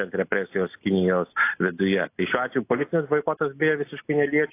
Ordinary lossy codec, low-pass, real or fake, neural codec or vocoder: AAC, 24 kbps; 3.6 kHz; real; none